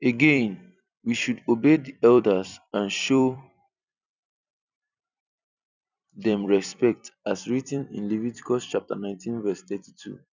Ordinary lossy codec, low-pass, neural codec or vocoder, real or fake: none; 7.2 kHz; vocoder, 44.1 kHz, 128 mel bands every 256 samples, BigVGAN v2; fake